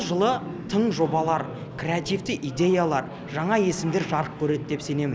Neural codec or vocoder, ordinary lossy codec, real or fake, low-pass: none; none; real; none